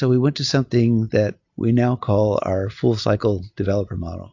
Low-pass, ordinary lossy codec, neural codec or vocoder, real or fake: 7.2 kHz; AAC, 48 kbps; none; real